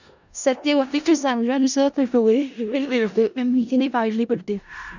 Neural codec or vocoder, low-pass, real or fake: codec, 16 kHz in and 24 kHz out, 0.4 kbps, LongCat-Audio-Codec, four codebook decoder; 7.2 kHz; fake